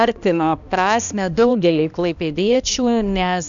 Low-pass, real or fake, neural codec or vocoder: 7.2 kHz; fake; codec, 16 kHz, 1 kbps, X-Codec, HuBERT features, trained on balanced general audio